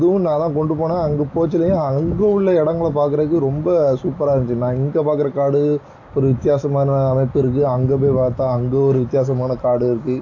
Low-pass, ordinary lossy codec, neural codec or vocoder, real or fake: 7.2 kHz; AAC, 48 kbps; none; real